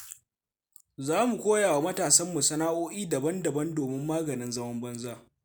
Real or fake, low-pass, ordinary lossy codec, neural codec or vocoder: real; none; none; none